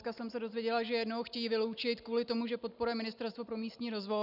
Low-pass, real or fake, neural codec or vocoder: 5.4 kHz; real; none